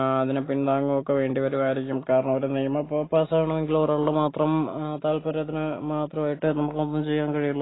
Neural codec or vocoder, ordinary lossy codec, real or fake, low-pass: none; AAC, 16 kbps; real; 7.2 kHz